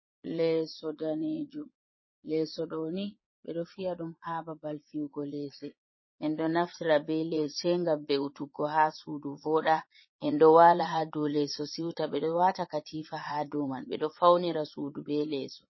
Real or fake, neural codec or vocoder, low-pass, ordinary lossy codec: fake; vocoder, 22.05 kHz, 80 mel bands, Vocos; 7.2 kHz; MP3, 24 kbps